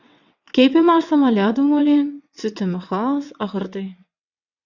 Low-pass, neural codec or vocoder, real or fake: 7.2 kHz; vocoder, 22.05 kHz, 80 mel bands, WaveNeXt; fake